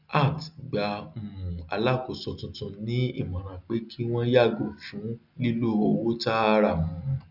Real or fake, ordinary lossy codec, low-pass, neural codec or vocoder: real; none; 5.4 kHz; none